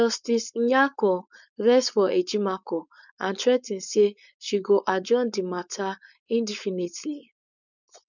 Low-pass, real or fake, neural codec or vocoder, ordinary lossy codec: 7.2 kHz; fake; codec, 16 kHz, 4.8 kbps, FACodec; none